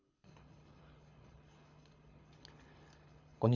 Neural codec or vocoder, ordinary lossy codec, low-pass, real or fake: codec, 24 kHz, 6 kbps, HILCodec; none; 7.2 kHz; fake